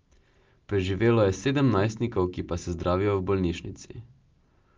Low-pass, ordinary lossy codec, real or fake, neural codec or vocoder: 7.2 kHz; Opus, 32 kbps; real; none